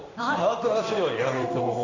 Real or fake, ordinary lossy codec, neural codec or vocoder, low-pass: fake; none; codec, 16 kHz in and 24 kHz out, 1 kbps, XY-Tokenizer; 7.2 kHz